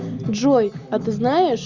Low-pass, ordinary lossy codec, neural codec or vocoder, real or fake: 7.2 kHz; Opus, 64 kbps; none; real